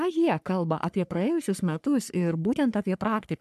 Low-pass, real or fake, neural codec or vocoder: 14.4 kHz; fake; codec, 44.1 kHz, 3.4 kbps, Pupu-Codec